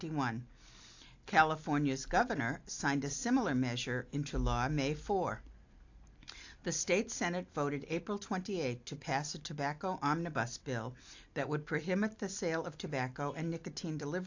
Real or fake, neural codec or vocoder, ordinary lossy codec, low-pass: real; none; AAC, 48 kbps; 7.2 kHz